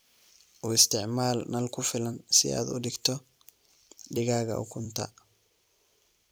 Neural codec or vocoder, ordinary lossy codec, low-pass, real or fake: vocoder, 44.1 kHz, 128 mel bands every 256 samples, BigVGAN v2; none; none; fake